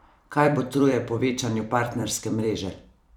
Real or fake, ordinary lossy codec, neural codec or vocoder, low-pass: fake; none; vocoder, 44.1 kHz, 128 mel bands every 256 samples, BigVGAN v2; 19.8 kHz